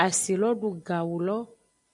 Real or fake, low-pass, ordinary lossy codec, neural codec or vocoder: real; 10.8 kHz; AAC, 64 kbps; none